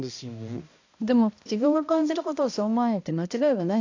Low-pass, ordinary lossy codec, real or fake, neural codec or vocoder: 7.2 kHz; AAC, 48 kbps; fake; codec, 16 kHz, 1 kbps, X-Codec, HuBERT features, trained on balanced general audio